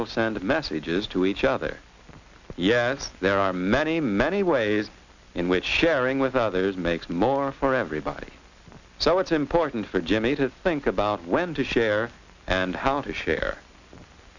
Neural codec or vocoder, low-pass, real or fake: none; 7.2 kHz; real